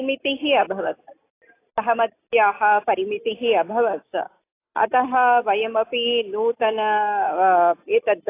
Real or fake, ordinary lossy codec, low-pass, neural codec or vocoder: real; AAC, 24 kbps; 3.6 kHz; none